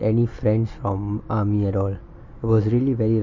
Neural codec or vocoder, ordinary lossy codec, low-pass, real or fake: none; MP3, 32 kbps; 7.2 kHz; real